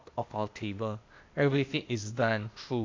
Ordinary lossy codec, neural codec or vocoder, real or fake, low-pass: MP3, 48 kbps; codec, 16 kHz, 0.8 kbps, ZipCodec; fake; 7.2 kHz